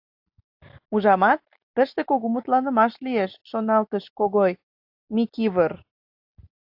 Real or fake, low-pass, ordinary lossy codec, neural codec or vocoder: real; 5.4 kHz; AAC, 48 kbps; none